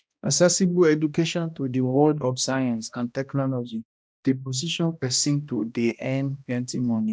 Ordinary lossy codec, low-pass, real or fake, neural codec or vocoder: none; none; fake; codec, 16 kHz, 1 kbps, X-Codec, HuBERT features, trained on balanced general audio